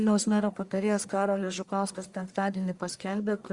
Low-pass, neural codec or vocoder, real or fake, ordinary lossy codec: 10.8 kHz; codec, 44.1 kHz, 1.7 kbps, Pupu-Codec; fake; Opus, 64 kbps